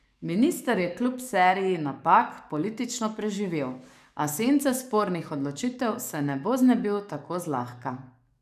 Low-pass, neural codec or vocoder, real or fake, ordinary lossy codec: 14.4 kHz; codec, 44.1 kHz, 7.8 kbps, DAC; fake; none